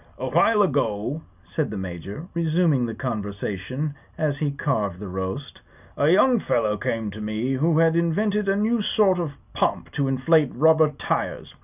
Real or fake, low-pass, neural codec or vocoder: real; 3.6 kHz; none